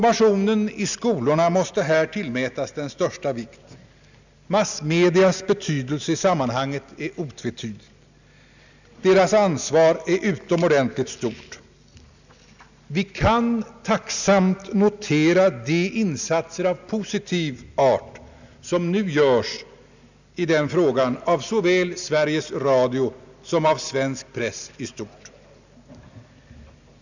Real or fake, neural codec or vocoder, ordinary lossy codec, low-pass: real; none; none; 7.2 kHz